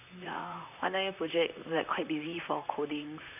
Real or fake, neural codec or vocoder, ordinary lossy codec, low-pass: fake; vocoder, 44.1 kHz, 128 mel bands, Pupu-Vocoder; none; 3.6 kHz